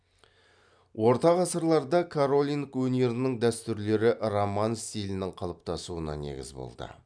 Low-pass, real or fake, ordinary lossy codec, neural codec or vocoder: 9.9 kHz; real; none; none